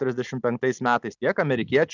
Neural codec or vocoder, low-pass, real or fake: none; 7.2 kHz; real